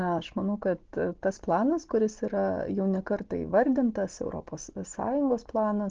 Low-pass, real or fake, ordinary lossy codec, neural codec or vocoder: 7.2 kHz; real; Opus, 16 kbps; none